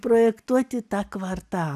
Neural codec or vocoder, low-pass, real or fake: none; 14.4 kHz; real